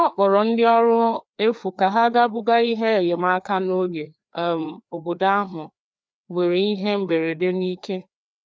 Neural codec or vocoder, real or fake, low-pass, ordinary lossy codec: codec, 16 kHz, 2 kbps, FreqCodec, larger model; fake; none; none